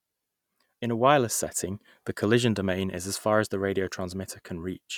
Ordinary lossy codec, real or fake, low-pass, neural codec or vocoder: none; real; 19.8 kHz; none